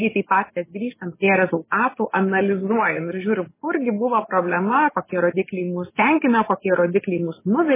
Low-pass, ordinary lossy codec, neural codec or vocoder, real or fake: 3.6 kHz; MP3, 16 kbps; none; real